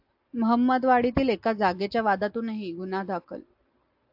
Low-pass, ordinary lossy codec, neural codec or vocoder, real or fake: 5.4 kHz; AAC, 48 kbps; none; real